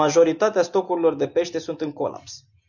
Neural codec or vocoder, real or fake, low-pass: none; real; 7.2 kHz